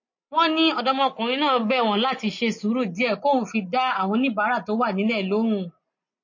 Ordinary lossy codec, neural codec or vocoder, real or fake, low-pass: MP3, 32 kbps; none; real; 7.2 kHz